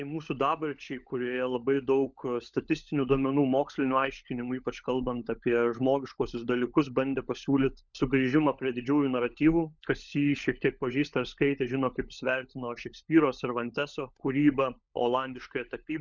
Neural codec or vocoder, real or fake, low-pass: codec, 16 kHz, 16 kbps, FunCodec, trained on LibriTTS, 50 frames a second; fake; 7.2 kHz